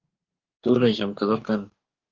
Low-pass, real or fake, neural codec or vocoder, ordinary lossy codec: 7.2 kHz; fake; codec, 44.1 kHz, 2.6 kbps, DAC; Opus, 32 kbps